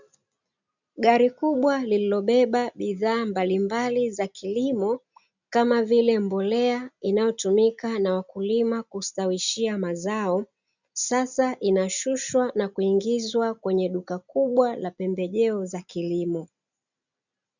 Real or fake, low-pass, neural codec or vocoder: real; 7.2 kHz; none